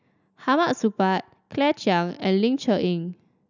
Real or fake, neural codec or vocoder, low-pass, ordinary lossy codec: real; none; 7.2 kHz; none